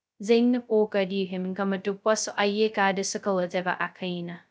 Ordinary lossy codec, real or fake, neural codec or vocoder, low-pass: none; fake; codec, 16 kHz, 0.2 kbps, FocalCodec; none